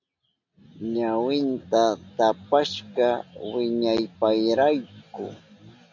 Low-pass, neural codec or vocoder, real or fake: 7.2 kHz; none; real